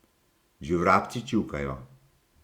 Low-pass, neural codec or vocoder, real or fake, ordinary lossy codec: 19.8 kHz; vocoder, 44.1 kHz, 128 mel bands every 512 samples, BigVGAN v2; fake; Opus, 64 kbps